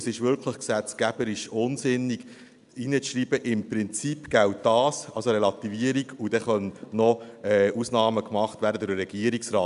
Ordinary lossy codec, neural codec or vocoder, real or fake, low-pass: none; none; real; 10.8 kHz